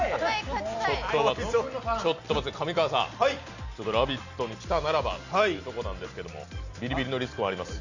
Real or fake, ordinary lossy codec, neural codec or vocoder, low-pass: real; none; none; 7.2 kHz